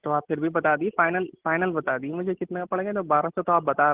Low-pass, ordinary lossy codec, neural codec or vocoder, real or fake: 3.6 kHz; Opus, 16 kbps; none; real